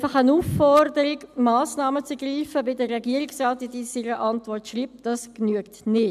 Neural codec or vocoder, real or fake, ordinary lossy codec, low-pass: none; real; none; 14.4 kHz